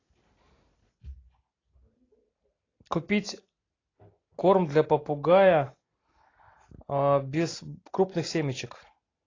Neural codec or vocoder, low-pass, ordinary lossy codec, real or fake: none; 7.2 kHz; AAC, 32 kbps; real